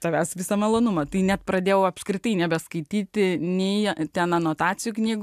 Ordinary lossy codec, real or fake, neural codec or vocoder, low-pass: AAC, 96 kbps; real; none; 14.4 kHz